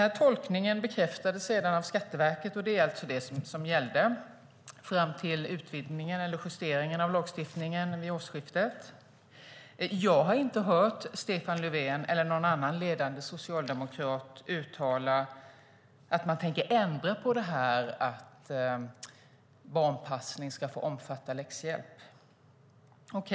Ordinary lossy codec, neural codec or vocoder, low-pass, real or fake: none; none; none; real